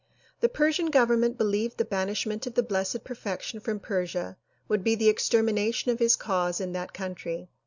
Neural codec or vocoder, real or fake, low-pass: none; real; 7.2 kHz